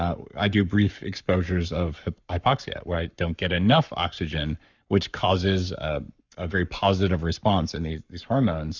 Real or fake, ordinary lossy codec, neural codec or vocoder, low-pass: fake; Opus, 64 kbps; codec, 44.1 kHz, 7.8 kbps, Pupu-Codec; 7.2 kHz